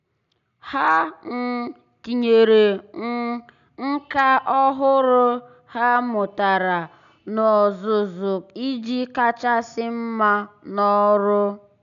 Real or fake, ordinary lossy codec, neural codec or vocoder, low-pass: real; none; none; 7.2 kHz